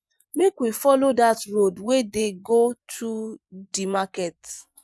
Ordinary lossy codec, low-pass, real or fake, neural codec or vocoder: none; none; fake; vocoder, 24 kHz, 100 mel bands, Vocos